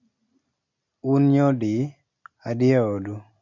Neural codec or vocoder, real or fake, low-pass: none; real; 7.2 kHz